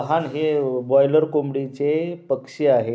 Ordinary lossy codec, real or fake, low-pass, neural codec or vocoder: none; real; none; none